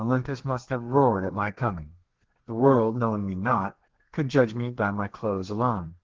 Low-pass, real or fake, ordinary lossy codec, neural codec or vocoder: 7.2 kHz; fake; Opus, 16 kbps; codec, 32 kHz, 1.9 kbps, SNAC